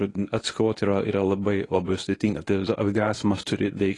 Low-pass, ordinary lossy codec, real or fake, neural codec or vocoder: 10.8 kHz; AAC, 32 kbps; fake; codec, 24 kHz, 0.9 kbps, WavTokenizer, medium speech release version 1